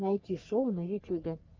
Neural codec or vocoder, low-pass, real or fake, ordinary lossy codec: codec, 44.1 kHz, 3.4 kbps, Pupu-Codec; 7.2 kHz; fake; Opus, 24 kbps